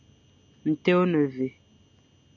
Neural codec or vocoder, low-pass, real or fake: none; 7.2 kHz; real